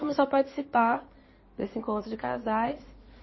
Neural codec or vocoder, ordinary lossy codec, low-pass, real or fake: codec, 16 kHz in and 24 kHz out, 2.2 kbps, FireRedTTS-2 codec; MP3, 24 kbps; 7.2 kHz; fake